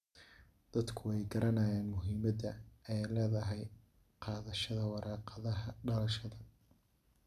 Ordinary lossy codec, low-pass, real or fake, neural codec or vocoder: none; 14.4 kHz; real; none